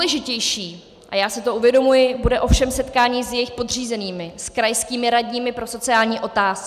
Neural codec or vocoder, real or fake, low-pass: none; real; 14.4 kHz